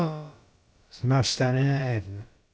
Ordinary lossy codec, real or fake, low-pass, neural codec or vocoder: none; fake; none; codec, 16 kHz, about 1 kbps, DyCAST, with the encoder's durations